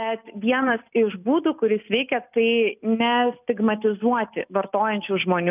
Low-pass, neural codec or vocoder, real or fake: 3.6 kHz; none; real